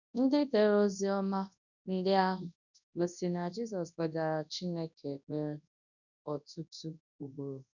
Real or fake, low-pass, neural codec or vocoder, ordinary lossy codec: fake; 7.2 kHz; codec, 24 kHz, 0.9 kbps, WavTokenizer, large speech release; none